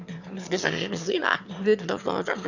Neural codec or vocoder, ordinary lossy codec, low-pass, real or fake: autoencoder, 22.05 kHz, a latent of 192 numbers a frame, VITS, trained on one speaker; none; 7.2 kHz; fake